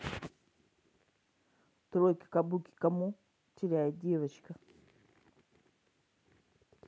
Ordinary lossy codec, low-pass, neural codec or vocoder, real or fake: none; none; none; real